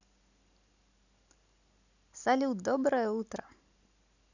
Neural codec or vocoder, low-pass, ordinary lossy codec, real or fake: none; 7.2 kHz; none; real